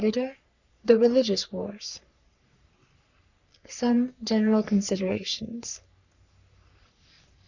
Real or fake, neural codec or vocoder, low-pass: fake; codec, 44.1 kHz, 3.4 kbps, Pupu-Codec; 7.2 kHz